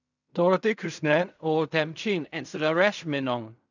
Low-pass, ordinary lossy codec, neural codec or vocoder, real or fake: 7.2 kHz; none; codec, 16 kHz in and 24 kHz out, 0.4 kbps, LongCat-Audio-Codec, fine tuned four codebook decoder; fake